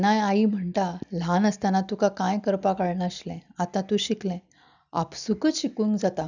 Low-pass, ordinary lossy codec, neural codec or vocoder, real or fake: 7.2 kHz; none; none; real